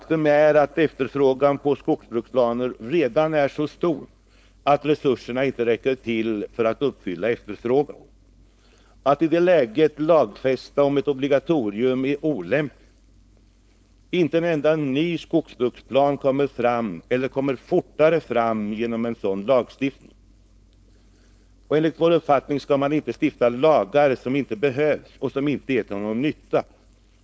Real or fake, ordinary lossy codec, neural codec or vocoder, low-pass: fake; none; codec, 16 kHz, 4.8 kbps, FACodec; none